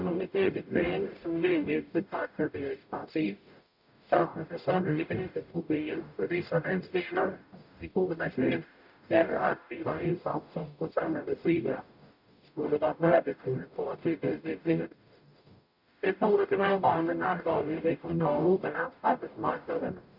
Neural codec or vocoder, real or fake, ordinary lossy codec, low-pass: codec, 44.1 kHz, 0.9 kbps, DAC; fake; none; 5.4 kHz